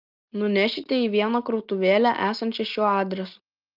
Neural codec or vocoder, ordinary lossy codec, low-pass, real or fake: none; Opus, 24 kbps; 5.4 kHz; real